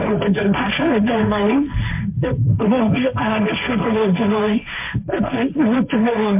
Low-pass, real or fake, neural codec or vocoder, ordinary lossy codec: 3.6 kHz; fake; codec, 16 kHz, 1.1 kbps, Voila-Tokenizer; MP3, 32 kbps